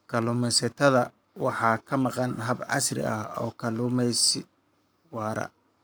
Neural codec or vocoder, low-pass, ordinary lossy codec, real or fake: codec, 44.1 kHz, 7.8 kbps, Pupu-Codec; none; none; fake